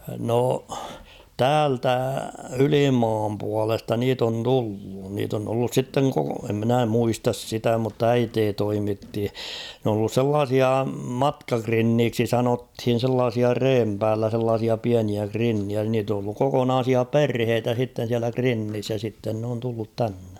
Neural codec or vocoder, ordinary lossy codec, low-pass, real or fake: none; none; 19.8 kHz; real